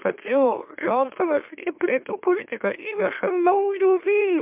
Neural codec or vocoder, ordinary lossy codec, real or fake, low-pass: autoencoder, 44.1 kHz, a latent of 192 numbers a frame, MeloTTS; MP3, 32 kbps; fake; 3.6 kHz